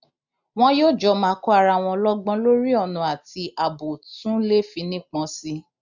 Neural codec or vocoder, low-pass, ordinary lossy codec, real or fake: none; 7.2 kHz; none; real